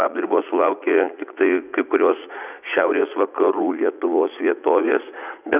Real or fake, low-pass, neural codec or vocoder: fake; 3.6 kHz; vocoder, 44.1 kHz, 80 mel bands, Vocos